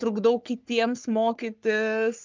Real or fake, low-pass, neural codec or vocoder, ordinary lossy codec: fake; 7.2 kHz; codec, 44.1 kHz, 3.4 kbps, Pupu-Codec; Opus, 24 kbps